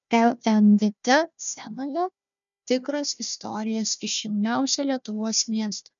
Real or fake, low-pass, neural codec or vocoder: fake; 7.2 kHz; codec, 16 kHz, 1 kbps, FunCodec, trained on Chinese and English, 50 frames a second